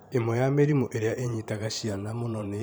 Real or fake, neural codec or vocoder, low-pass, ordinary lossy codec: fake; vocoder, 44.1 kHz, 128 mel bands every 512 samples, BigVGAN v2; none; none